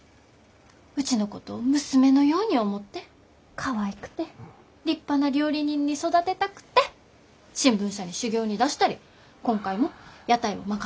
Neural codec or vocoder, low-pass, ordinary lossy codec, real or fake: none; none; none; real